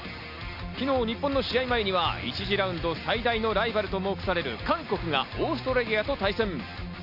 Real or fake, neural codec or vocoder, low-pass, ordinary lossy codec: real; none; 5.4 kHz; none